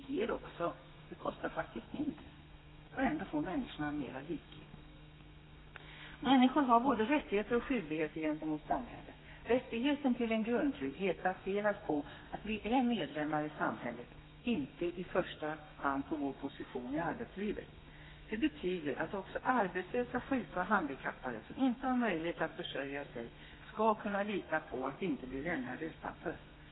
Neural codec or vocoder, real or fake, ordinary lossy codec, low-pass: codec, 32 kHz, 1.9 kbps, SNAC; fake; AAC, 16 kbps; 7.2 kHz